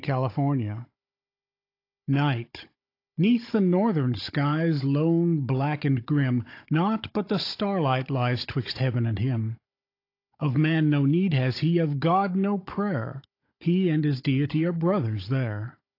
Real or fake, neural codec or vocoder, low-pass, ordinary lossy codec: fake; codec, 16 kHz, 16 kbps, FunCodec, trained on Chinese and English, 50 frames a second; 5.4 kHz; AAC, 32 kbps